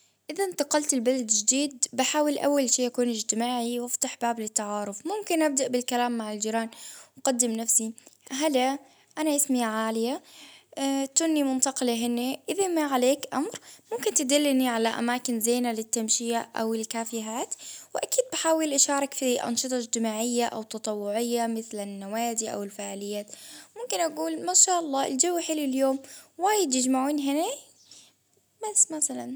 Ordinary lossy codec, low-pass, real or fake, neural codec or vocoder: none; none; real; none